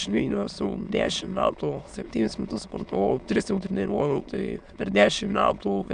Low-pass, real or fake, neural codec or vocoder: 9.9 kHz; fake; autoencoder, 22.05 kHz, a latent of 192 numbers a frame, VITS, trained on many speakers